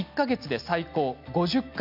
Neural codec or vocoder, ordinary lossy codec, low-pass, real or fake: none; none; 5.4 kHz; real